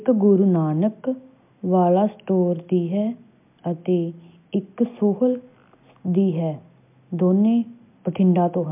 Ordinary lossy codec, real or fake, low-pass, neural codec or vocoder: MP3, 32 kbps; real; 3.6 kHz; none